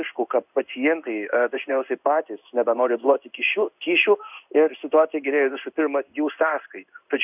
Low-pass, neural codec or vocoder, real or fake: 3.6 kHz; codec, 16 kHz in and 24 kHz out, 1 kbps, XY-Tokenizer; fake